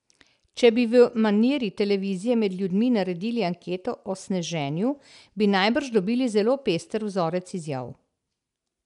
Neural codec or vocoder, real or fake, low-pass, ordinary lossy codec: none; real; 10.8 kHz; none